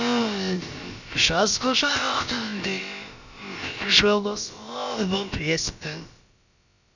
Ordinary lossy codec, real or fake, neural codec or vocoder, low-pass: none; fake; codec, 16 kHz, about 1 kbps, DyCAST, with the encoder's durations; 7.2 kHz